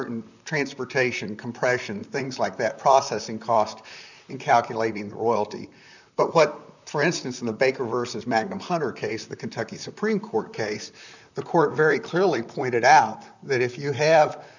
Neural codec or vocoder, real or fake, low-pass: vocoder, 44.1 kHz, 128 mel bands, Pupu-Vocoder; fake; 7.2 kHz